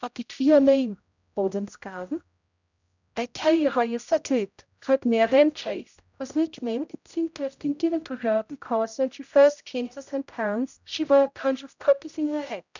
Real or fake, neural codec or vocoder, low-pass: fake; codec, 16 kHz, 0.5 kbps, X-Codec, HuBERT features, trained on general audio; 7.2 kHz